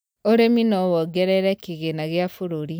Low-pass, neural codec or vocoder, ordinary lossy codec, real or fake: none; none; none; real